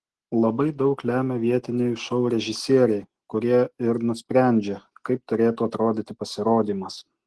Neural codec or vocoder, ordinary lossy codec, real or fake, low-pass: none; Opus, 16 kbps; real; 10.8 kHz